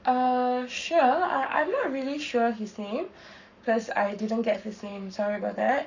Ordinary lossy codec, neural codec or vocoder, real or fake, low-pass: none; codec, 44.1 kHz, 7.8 kbps, Pupu-Codec; fake; 7.2 kHz